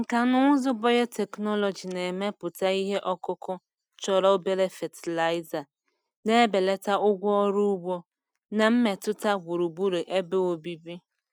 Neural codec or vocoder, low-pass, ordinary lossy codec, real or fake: none; 19.8 kHz; none; real